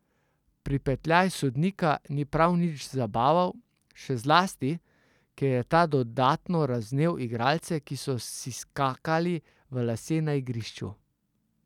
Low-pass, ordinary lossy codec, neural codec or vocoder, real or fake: 19.8 kHz; none; none; real